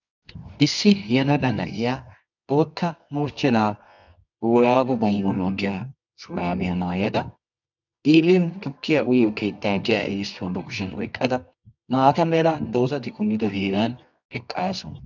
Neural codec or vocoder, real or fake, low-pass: codec, 24 kHz, 0.9 kbps, WavTokenizer, medium music audio release; fake; 7.2 kHz